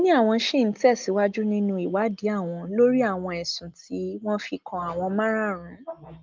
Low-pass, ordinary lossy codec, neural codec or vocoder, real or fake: 7.2 kHz; Opus, 32 kbps; none; real